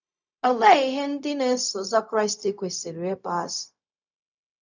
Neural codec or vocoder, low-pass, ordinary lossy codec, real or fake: codec, 16 kHz, 0.4 kbps, LongCat-Audio-Codec; 7.2 kHz; none; fake